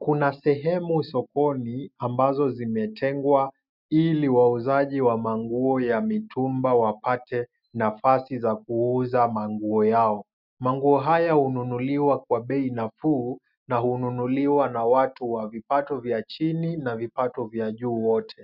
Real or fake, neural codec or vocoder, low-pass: real; none; 5.4 kHz